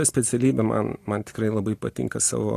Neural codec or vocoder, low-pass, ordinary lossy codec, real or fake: none; 14.4 kHz; AAC, 48 kbps; real